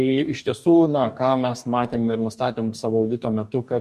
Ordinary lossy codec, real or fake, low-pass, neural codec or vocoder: MP3, 64 kbps; fake; 14.4 kHz; codec, 44.1 kHz, 2.6 kbps, DAC